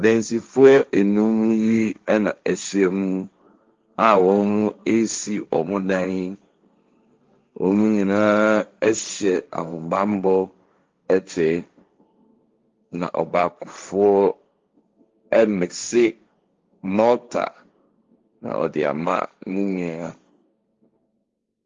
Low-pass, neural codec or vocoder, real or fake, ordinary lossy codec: 7.2 kHz; codec, 16 kHz, 1.1 kbps, Voila-Tokenizer; fake; Opus, 32 kbps